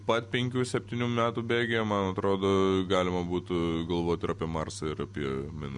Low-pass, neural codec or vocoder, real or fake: 10.8 kHz; none; real